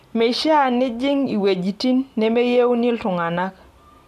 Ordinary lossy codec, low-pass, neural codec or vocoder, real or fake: none; 14.4 kHz; none; real